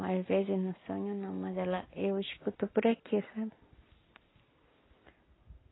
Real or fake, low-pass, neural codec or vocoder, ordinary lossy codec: real; 7.2 kHz; none; AAC, 16 kbps